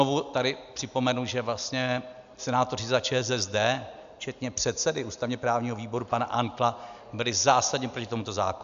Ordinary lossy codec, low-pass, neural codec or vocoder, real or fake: AAC, 96 kbps; 7.2 kHz; none; real